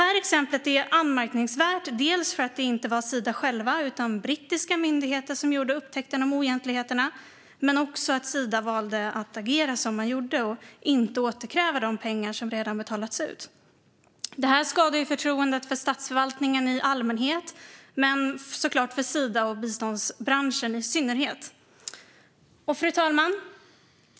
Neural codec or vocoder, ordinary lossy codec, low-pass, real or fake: none; none; none; real